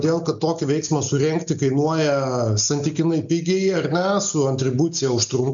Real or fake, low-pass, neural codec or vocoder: real; 7.2 kHz; none